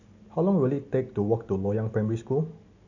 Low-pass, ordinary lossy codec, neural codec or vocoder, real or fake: 7.2 kHz; AAC, 48 kbps; none; real